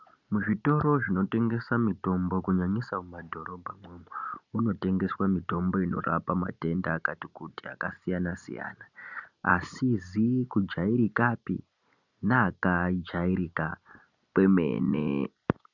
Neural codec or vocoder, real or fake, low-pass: none; real; 7.2 kHz